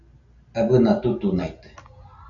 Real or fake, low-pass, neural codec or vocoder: real; 7.2 kHz; none